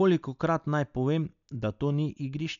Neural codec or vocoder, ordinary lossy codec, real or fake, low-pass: none; none; real; 7.2 kHz